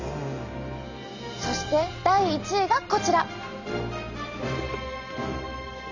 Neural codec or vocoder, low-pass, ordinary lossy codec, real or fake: none; 7.2 kHz; none; real